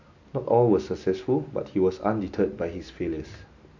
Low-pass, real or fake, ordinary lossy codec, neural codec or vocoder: 7.2 kHz; real; AAC, 48 kbps; none